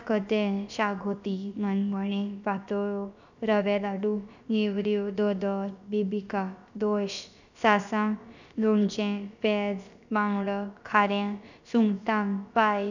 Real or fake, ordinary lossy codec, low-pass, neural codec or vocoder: fake; none; 7.2 kHz; codec, 16 kHz, about 1 kbps, DyCAST, with the encoder's durations